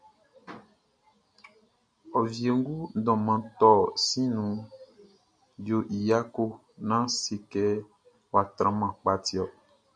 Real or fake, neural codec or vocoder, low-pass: real; none; 9.9 kHz